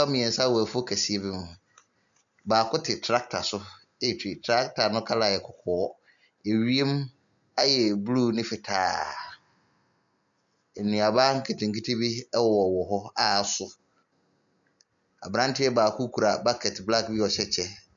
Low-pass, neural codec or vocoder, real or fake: 7.2 kHz; none; real